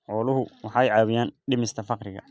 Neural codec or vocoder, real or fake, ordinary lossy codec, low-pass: none; real; none; none